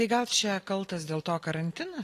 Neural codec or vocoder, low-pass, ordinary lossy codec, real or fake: none; 14.4 kHz; AAC, 48 kbps; real